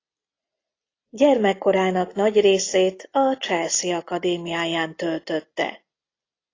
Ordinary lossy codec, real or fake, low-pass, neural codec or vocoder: AAC, 32 kbps; real; 7.2 kHz; none